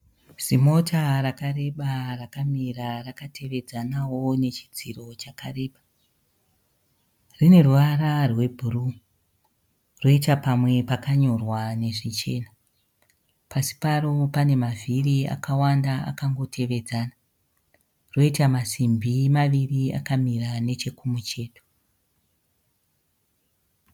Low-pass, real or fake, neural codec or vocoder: 19.8 kHz; real; none